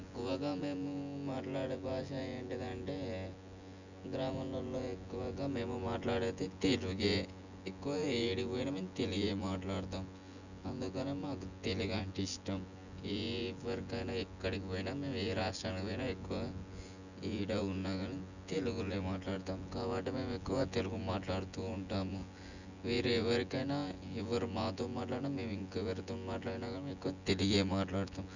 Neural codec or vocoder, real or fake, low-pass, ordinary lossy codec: vocoder, 24 kHz, 100 mel bands, Vocos; fake; 7.2 kHz; none